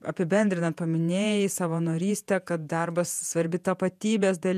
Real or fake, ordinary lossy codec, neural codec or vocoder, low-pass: fake; MP3, 96 kbps; vocoder, 48 kHz, 128 mel bands, Vocos; 14.4 kHz